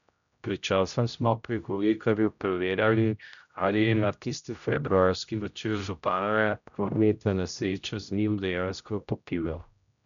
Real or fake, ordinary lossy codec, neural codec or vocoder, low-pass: fake; none; codec, 16 kHz, 0.5 kbps, X-Codec, HuBERT features, trained on general audio; 7.2 kHz